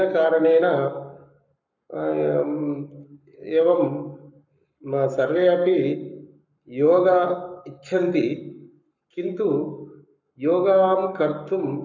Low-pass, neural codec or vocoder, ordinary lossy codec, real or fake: 7.2 kHz; codec, 44.1 kHz, 7.8 kbps, Pupu-Codec; none; fake